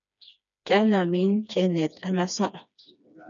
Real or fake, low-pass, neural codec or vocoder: fake; 7.2 kHz; codec, 16 kHz, 2 kbps, FreqCodec, smaller model